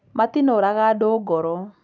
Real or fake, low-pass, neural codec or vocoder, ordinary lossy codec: real; none; none; none